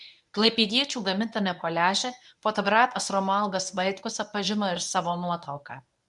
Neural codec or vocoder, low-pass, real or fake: codec, 24 kHz, 0.9 kbps, WavTokenizer, medium speech release version 1; 10.8 kHz; fake